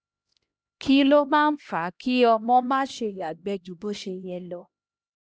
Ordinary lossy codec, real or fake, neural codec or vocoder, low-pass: none; fake; codec, 16 kHz, 1 kbps, X-Codec, HuBERT features, trained on LibriSpeech; none